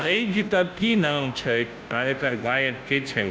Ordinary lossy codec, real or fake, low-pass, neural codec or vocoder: none; fake; none; codec, 16 kHz, 0.5 kbps, FunCodec, trained on Chinese and English, 25 frames a second